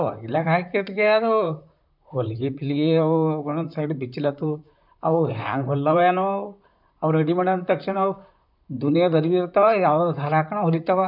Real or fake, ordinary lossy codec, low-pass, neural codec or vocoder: fake; none; 5.4 kHz; vocoder, 44.1 kHz, 128 mel bands, Pupu-Vocoder